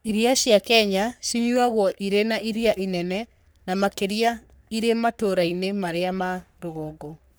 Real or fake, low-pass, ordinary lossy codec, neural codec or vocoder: fake; none; none; codec, 44.1 kHz, 3.4 kbps, Pupu-Codec